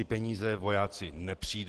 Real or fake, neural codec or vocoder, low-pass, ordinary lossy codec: real; none; 10.8 kHz; Opus, 16 kbps